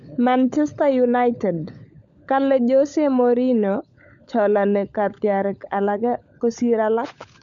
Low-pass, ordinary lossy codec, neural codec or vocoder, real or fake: 7.2 kHz; none; codec, 16 kHz, 16 kbps, FunCodec, trained on LibriTTS, 50 frames a second; fake